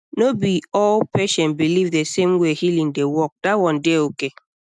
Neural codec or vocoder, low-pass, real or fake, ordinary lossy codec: none; none; real; none